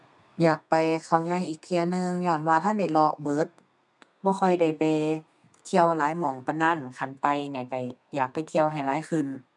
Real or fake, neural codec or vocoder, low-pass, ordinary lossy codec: fake; codec, 32 kHz, 1.9 kbps, SNAC; 10.8 kHz; none